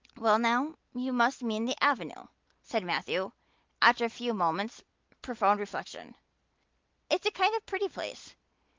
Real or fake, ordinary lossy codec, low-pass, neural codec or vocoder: real; Opus, 24 kbps; 7.2 kHz; none